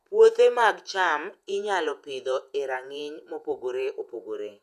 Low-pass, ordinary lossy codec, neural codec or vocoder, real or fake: 14.4 kHz; none; vocoder, 48 kHz, 128 mel bands, Vocos; fake